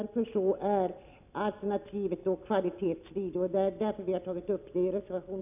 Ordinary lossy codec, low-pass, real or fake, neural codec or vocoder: none; 3.6 kHz; real; none